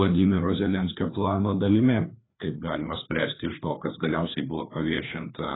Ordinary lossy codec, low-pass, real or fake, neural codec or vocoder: AAC, 16 kbps; 7.2 kHz; fake; codec, 16 kHz, 2 kbps, FreqCodec, larger model